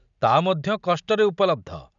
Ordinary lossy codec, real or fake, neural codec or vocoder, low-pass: none; real; none; 7.2 kHz